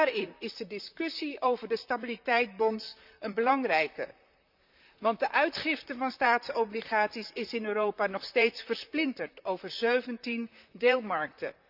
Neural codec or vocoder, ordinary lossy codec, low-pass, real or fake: vocoder, 44.1 kHz, 128 mel bands, Pupu-Vocoder; none; 5.4 kHz; fake